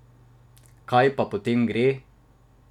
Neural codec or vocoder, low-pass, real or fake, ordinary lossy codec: none; 19.8 kHz; real; none